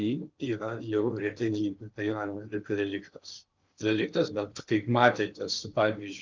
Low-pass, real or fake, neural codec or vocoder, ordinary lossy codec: 7.2 kHz; fake; codec, 16 kHz in and 24 kHz out, 0.8 kbps, FocalCodec, streaming, 65536 codes; Opus, 24 kbps